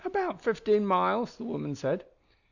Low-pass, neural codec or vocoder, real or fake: 7.2 kHz; none; real